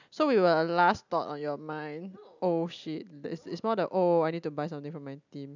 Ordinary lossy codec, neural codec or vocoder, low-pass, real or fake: none; none; 7.2 kHz; real